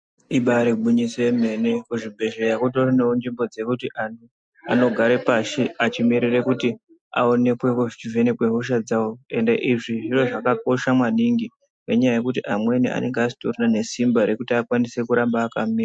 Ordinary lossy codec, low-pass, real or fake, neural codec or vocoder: MP3, 64 kbps; 9.9 kHz; real; none